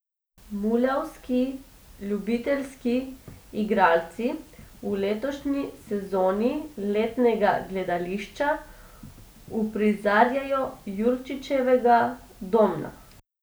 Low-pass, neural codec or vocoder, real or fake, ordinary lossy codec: none; none; real; none